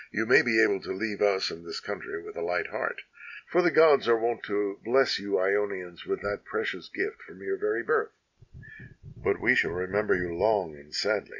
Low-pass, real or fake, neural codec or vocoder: 7.2 kHz; real; none